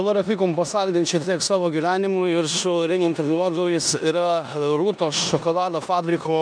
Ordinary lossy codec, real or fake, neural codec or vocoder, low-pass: MP3, 96 kbps; fake; codec, 16 kHz in and 24 kHz out, 0.9 kbps, LongCat-Audio-Codec, four codebook decoder; 9.9 kHz